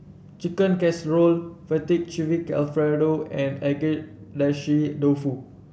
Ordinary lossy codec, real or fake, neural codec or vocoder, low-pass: none; real; none; none